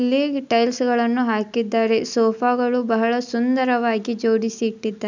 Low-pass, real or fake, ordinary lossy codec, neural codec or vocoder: 7.2 kHz; real; none; none